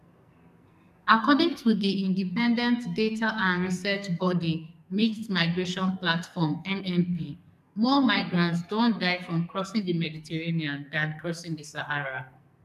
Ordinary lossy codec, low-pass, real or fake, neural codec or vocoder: none; 14.4 kHz; fake; codec, 44.1 kHz, 2.6 kbps, SNAC